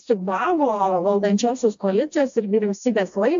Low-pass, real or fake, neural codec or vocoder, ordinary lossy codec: 7.2 kHz; fake; codec, 16 kHz, 1 kbps, FreqCodec, smaller model; AAC, 64 kbps